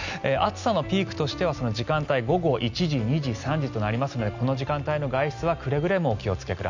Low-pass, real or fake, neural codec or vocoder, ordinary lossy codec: 7.2 kHz; real; none; none